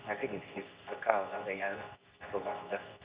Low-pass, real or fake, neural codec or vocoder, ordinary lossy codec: 3.6 kHz; fake; codec, 24 kHz, 0.9 kbps, WavTokenizer, medium speech release version 2; Opus, 64 kbps